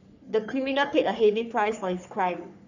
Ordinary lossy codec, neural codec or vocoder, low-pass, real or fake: none; codec, 44.1 kHz, 3.4 kbps, Pupu-Codec; 7.2 kHz; fake